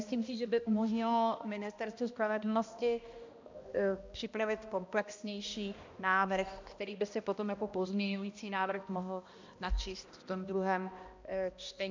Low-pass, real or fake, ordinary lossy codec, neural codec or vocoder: 7.2 kHz; fake; MP3, 64 kbps; codec, 16 kHz, 1 kbps, X-Codec, HuBERT features, trained on balanced general audio